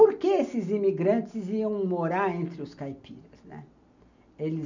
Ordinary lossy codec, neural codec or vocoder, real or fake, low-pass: none; none; real; 7.2 kHz